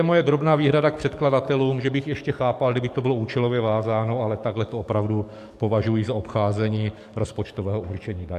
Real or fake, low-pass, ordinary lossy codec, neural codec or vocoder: fake; 14.4 kHz; AAC, 96 kbps; codec, 44.1 kHz, 7.8 kbps, DAC